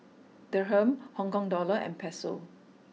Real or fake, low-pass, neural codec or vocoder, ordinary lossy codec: real; none; none; none